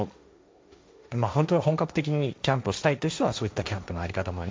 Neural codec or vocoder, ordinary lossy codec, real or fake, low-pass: codec, 16 kHz, 1.1 kbps, Voila-Tokenizer; none; fake; none